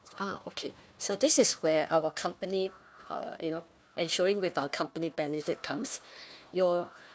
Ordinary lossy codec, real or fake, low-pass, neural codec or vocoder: none; fake; none; codec, 16 kHz, 1 kbps, FunCodec, trained on Chinese and English, 50 frames a second